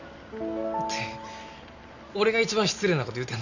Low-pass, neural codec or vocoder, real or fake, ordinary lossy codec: 7.2 kHz; none; real; none